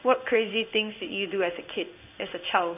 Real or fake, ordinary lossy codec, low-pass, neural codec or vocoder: fake; none; 3.6 kHz; codec, 16 kHz in and 24 kHz out, 1 kbps, XY-Tokenizer